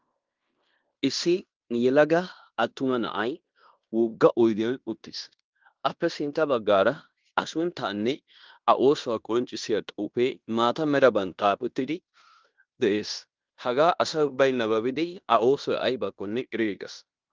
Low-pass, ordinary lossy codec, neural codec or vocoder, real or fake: 7.2 kHz; Opus, 32 kbps; codec, 16 kHz in and 24 kHz out, 0.9 kbps, LongCat-Audio-Codec, fine tuned four codebook decoder; fake